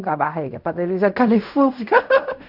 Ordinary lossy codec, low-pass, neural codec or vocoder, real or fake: none; 5.4 kHz; codec, 16 kHz in and 24 kHz out, 0.4 kbps, LongCat-Audio-Codec, fine tuned four codebook decoder; fake